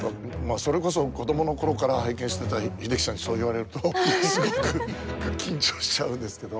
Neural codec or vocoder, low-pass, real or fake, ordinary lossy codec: none; none; real; none